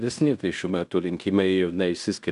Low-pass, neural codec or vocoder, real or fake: 10.8 kHz; codec, 16 kHz in and 24 kHz out, 0.9 kbps, LongCat-Audio-Codec, fine tuned four codebook decoder; fake